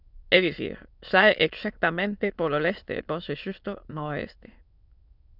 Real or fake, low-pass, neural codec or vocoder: fake; 5.4 kHz; autoencoder, 22.05 kHz, a latent of 192 numbers a frame, VITS, trained on many speakers